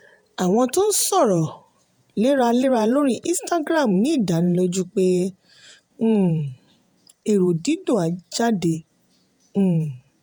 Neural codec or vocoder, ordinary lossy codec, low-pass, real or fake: vocoder, 48 kHz, 128 mel bands, Vocos; none; none; fake